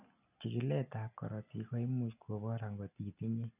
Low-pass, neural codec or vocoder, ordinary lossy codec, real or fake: 3.6 kHz; none; none; real